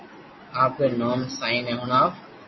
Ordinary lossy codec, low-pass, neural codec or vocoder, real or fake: MP3, 24 kbps; 7.2 kHz; none; real